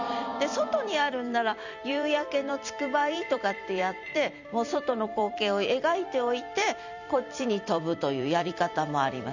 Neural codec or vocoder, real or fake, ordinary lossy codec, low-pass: vocoder, 44.1 kHz, 128 mel bands every 256 samples, BigVGAN v2; fake; none; 7.2 kHz